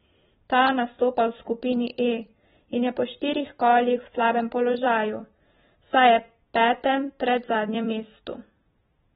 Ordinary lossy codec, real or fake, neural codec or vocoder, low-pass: AAC, 16 kbps; fake; vocoder, 44.1 kHz, 128 mel bands every 256 samples, BigVGAN v2; 19.8 kHz